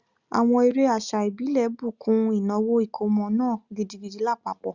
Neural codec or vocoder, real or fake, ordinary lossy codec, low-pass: none; real; Opus, 64 kbps; 7.2 kHz